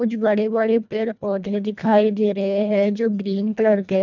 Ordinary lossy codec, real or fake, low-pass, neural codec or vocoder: none; fake; 7.2 kHz; codec, 24 kHz, 1.5 kbps, HILCodec